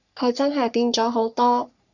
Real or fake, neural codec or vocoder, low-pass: fake; codec, 44.1 kHz, 3.4 kbps, Pupu-Codec; 7.2 kHz